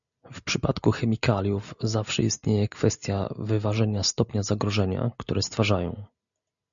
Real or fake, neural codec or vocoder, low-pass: real; none; 7.2 kHz